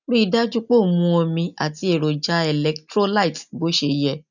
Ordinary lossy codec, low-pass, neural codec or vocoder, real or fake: none; 7.2 kHz; none; real